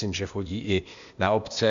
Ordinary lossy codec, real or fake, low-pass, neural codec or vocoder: Opus, 64 kbps; fake; 7.2 kHz; codec, 16 kHz, 0.8 kbps, ZipCodec